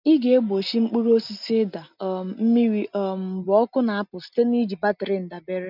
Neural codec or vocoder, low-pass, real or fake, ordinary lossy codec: none; 5.4 kHz; real; none